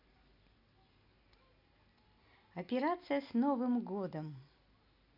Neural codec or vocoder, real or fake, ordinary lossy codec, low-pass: none; real; none; 5.4 kHz